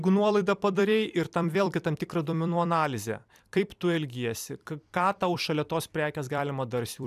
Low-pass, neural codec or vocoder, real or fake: 14.4 kHz; vocoder, 44.1 kHz, 128 mel bands every 256 samples, BigVGAN v2; fake